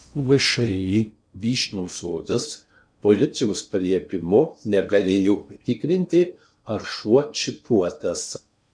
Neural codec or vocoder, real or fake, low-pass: codec, 16 kHz in and 24 kHz out, 0.6 kbps, FocalCodec, streaming, 4096 codes; fake; 9.9 kHz